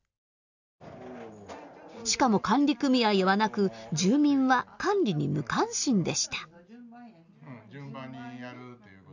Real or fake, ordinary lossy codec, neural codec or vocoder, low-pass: real; none; none; 7.2 kHz